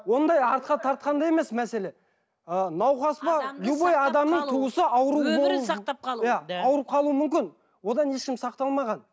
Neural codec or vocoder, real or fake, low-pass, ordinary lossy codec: none; real; none; none